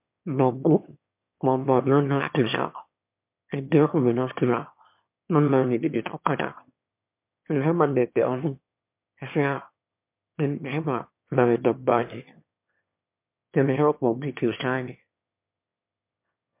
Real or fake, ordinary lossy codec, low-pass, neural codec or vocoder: fake; MP3, 32 kbps; 3.6 kHz; autoencoder, 22.05 kHz, a latent of 192 numbers a frame, VITS, trained on one speaker